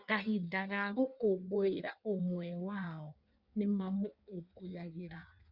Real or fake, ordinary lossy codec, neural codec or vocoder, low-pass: fake; Opus, 64 kbps; codec, 16 kHz in and 24 kHz out, 1.1 kbps, FireRedTTS-2 codec; 5.4 kHz